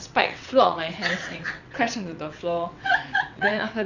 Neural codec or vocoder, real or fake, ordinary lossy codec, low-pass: vocoder, 22.05 kHz, 80 mel bands, WaveNeXt; fake; none; 7.2 kHz